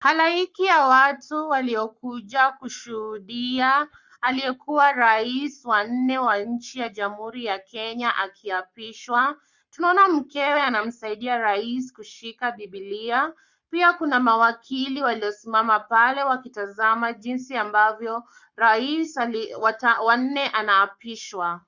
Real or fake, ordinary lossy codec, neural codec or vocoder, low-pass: fake; Opus, 64 kbps; codec, 44.1 kHz, 7.8 kbps, Pupu-Codec; 7.2 kHz